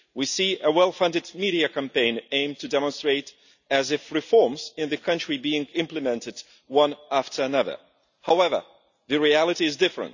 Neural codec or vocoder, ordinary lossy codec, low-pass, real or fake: none; none; 7.2 kHz; real